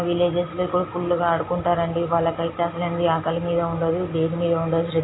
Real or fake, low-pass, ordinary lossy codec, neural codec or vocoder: real; 7.2 kHz; AAC, 16 kbps; none